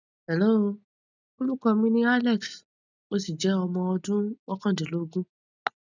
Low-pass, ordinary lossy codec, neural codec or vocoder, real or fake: 7.2 kHz; none; none; real